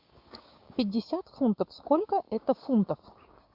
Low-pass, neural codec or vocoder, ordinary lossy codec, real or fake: 5.4 kHz; codec, 16 kHz, 6 kbps, DAC; Opus, 64 kbps; fake